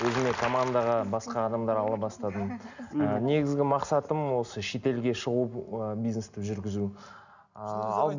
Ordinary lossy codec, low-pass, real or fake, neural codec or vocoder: none; 7.2 kHz; real; none